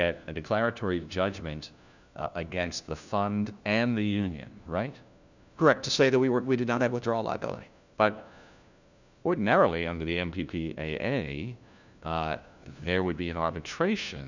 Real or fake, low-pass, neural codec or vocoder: fake; 7.2 kHz; codec, 16 kHz, 1 kbps, FunCodec, trained on LibriTTS, 50 frames a second